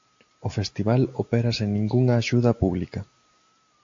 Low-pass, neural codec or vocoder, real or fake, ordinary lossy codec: 7.2 kHz; none; real; AAC, 48 kbps